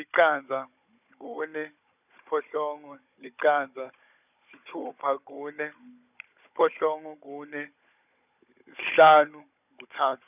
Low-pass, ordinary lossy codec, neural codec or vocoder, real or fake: 3.6 kHz; none; codec, 16 kHz, 16 kbps, FunCodec, trained on Chinese and English, 50 frames a second; fake